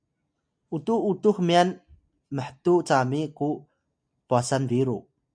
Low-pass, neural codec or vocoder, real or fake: 9.9 kHz; none; real